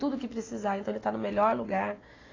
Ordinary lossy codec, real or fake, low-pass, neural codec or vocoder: AAC, 32 kbps; real; 7.2 kHz; none